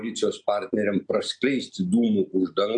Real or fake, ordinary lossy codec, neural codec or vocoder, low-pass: real; AAC, 64 kbps; none; 9.9 kHz